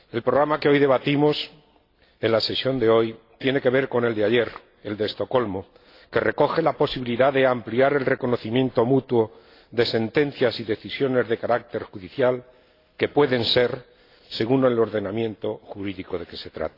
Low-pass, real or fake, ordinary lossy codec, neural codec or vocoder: 5.4 kHz; real; AAC, 32 kbps; none